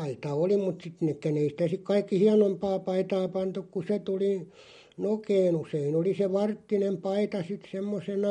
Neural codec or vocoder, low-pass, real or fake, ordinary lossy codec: none; 19.8 kHz; real; MP3, 48 kbps